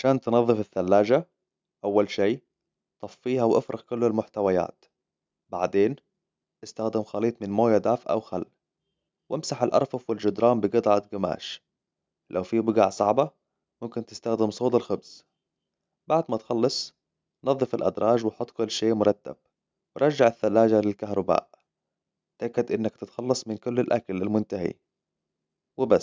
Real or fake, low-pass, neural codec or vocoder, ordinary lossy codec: real; none; none; none